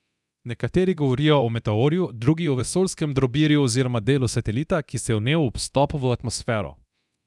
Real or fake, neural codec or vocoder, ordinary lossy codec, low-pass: fake; codec, 24 kHz, 0.9 kbps, DualCodec; none; none